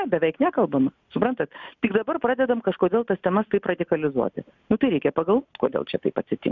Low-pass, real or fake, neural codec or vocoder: 7.2 kHz; real; none